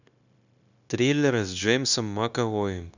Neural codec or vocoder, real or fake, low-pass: codec, 16 kHz, 0.9 kbps, LongCat-Audio-Codec; fake; 7.2 kHz